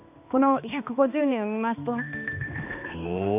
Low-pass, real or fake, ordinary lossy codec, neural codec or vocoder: 3.6 kHz; fake; none; codec, 16 kHz, 2 kbps, X-Codec, HuBERT features, trained on balanced general audio